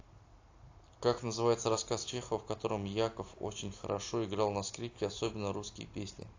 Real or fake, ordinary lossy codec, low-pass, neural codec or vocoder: real; AAC, 48 kbps; 7.2 kHz; none